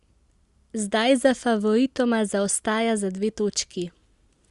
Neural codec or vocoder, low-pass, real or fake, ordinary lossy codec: none; 10.8 kHz; real; Opus, 64 kbps